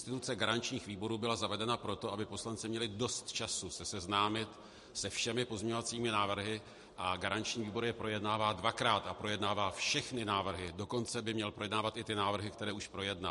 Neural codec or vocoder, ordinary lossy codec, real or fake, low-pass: none; MP3, 48 kbps; real; 14.4 kHz